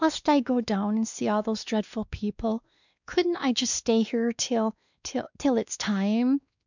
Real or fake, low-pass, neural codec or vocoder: fake; 7.2 kHz; codec, 16 kHz, 2 kbps, X-Codec, WavLM features, trained on Multilingual LibriSpeech